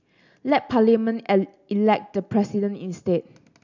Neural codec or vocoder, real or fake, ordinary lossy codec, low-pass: none; real; none; 7.2 kHz